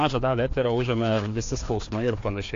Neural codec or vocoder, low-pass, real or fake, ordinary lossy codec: codec, 16 kHz, 2 kbps, FreqCodec, larger model; 7.2 kHz; fake; AAC, 48 kbps